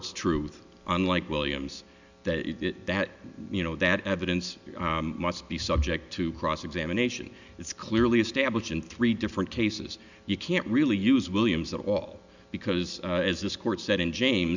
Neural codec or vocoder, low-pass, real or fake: none; 7.2 kHz; real